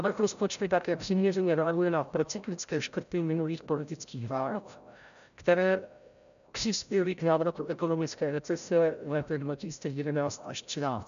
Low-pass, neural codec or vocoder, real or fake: 7.2 kHz; codec, 16 kHz, 0.5 kbps, FreqCodec, larger model; fake